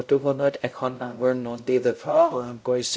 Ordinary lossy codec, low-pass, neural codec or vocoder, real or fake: none; none; codec, 16 kHz, 0.5 kbps, X-Codec, WavLM features, trained on Multilingual LibriSpeech; fake